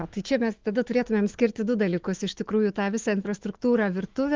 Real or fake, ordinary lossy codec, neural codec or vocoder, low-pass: fake; Opus, 16 kbps; autoencoder, 48 kHz, 128 numbers a frame, DAC-VAE, trained on Japanese speech; 7.2 kHz